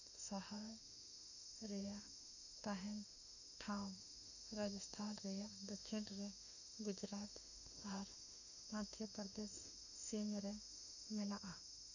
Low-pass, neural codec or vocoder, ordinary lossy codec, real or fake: 7.2 kHz; autoencoder, 48 kHz, 32 numbers a frame, DAC-VAE, trained on Japanese speech; none; fake